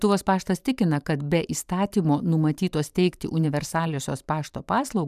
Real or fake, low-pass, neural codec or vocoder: fake; 14.4 kHz; vocoder, 44.1 kHz, 128 mel bands every 512 samples, BigVGAN v2